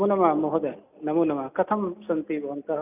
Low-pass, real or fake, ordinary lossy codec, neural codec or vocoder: 3.6 kHz; real; none; none